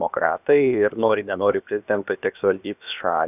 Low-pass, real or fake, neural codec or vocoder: 3.6 kHz; fake; codec, 16 kHz, about 1 kbps, DyCAST, with the encoder's durations